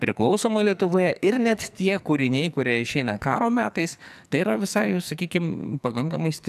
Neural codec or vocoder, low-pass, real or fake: codec, 44.1 kHz, 2.6 kbps, SNAC; 14.4 kHz; fake